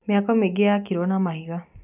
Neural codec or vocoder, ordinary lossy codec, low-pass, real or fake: none; none; 3.6 kHz; real